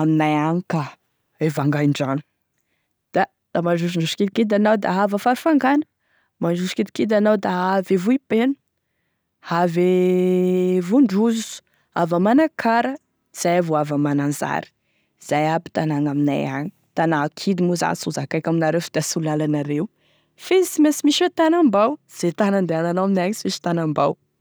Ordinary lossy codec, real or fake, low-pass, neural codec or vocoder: none; real; none; none